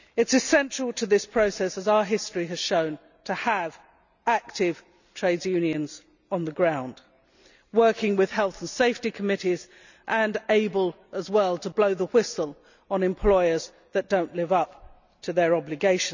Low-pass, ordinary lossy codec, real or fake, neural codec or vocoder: 7.2 kHz; none; real; none